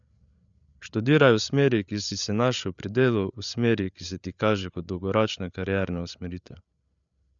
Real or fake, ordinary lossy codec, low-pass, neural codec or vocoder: fake; none; 7.2 kHz; codec, 16 kHz, 8 kbps, FreqCodec, larger model